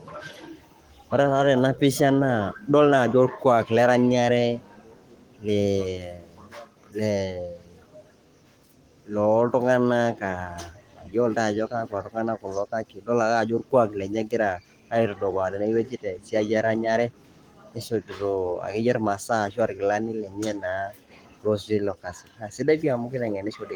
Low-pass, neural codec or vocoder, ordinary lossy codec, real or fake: 19.8 kHz; codec, 44.1 kHz, 7.8 kbps, Pupu-Codec; Opus, 24 kbps; fake